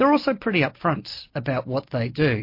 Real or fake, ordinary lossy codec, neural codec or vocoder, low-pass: real; MP3, 32 kbps; none; 5.4 kHz